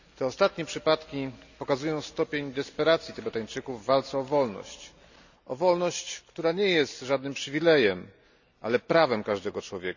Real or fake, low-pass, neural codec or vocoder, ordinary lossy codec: real; 7.2 kHz; none; none